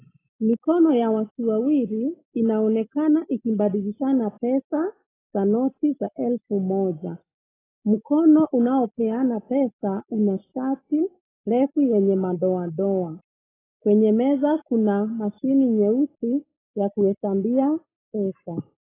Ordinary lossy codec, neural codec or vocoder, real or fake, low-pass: AAC, 16 kbps; none; real; 3.6 kHz